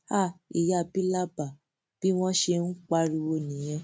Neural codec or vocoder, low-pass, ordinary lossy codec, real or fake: none; none; none; real